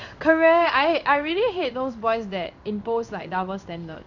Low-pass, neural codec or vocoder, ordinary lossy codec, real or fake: 7.2 kHz; codec, 16 kHz in and 24 kHz out, 1 kbps, XY-Tokenizer; none; fake